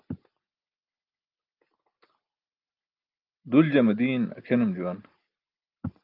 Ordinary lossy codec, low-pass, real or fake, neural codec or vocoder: Opus, 24 kbps; 5.4 kHz; real; none